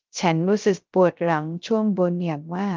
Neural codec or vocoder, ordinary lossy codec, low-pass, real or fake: codec, 16 kHz, 0.3 kbps, FocalCodec; Opus, 32 kbps; 7.2 kHz; fake